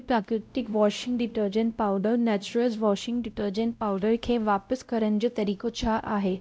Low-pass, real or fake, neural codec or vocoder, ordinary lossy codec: none; fake; codec, 16 kHz, 0.5 kbps, X-Codec, WavLM features, trained on Multilingual LibriSpeech; none